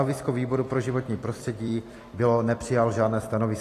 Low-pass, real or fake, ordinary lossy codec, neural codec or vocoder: 14.4 kHz; fake; AAC, 48 kbps; vocoder, 44.1 kHz, 128 mel bands every 256 samples, BigVGAN v2